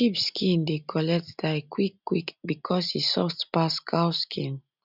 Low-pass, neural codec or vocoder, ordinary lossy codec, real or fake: 5.4 kHz; none; none; real